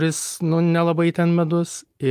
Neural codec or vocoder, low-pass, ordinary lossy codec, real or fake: none; 14.4 kHz; Opus, 32 kbps; real